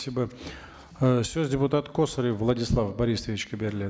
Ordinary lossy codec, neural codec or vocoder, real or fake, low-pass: none; none; real; none